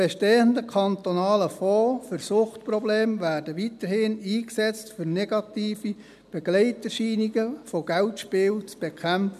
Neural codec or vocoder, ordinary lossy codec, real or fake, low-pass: none; none; real; 14.4 kHz